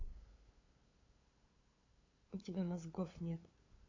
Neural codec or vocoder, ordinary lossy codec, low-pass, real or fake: codec, 16 kHz, 8 kbps, FunCodec, trained on LibriTTS, 25 frames a second; AAC, 32 kbps; 7.2 kHz; fake